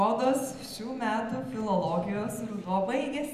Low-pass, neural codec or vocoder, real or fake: 14.4 kHz; none; real